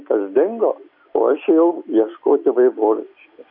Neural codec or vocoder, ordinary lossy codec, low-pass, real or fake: none; AAC, 48 kbps; 5.4 kHz; real